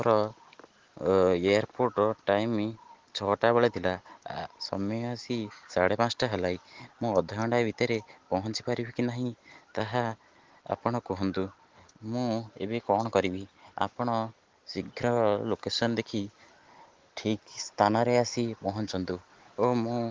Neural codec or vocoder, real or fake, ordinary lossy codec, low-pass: none; real; Opus, 16 kbps; 7.2 kHz